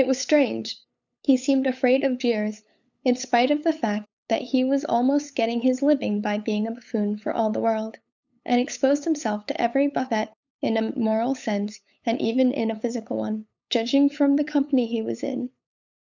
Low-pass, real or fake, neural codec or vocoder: 7.2 kHz; fake; codec, 16 kHz, 8 kbps, FunCodec, trained on LibriTTS, 25 frames a second